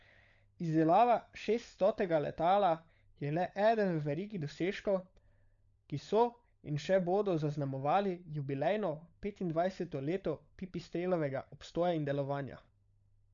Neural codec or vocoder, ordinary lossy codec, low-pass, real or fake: codec, 16 kHz, 16 kbps, FunCodec, trained on LibriTTS, 50 frames a second; none; 7.2 kHz; fake